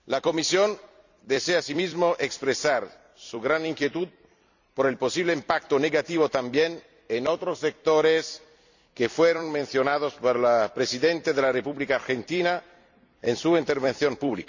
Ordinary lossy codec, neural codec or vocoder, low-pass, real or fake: none; vocoder, 44.1 kHz, 128 mel bands every 256 samples, BigVGAN v2; 7.2 kHz; fake